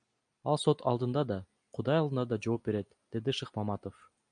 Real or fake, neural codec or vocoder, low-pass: real; none; 9.9 kHz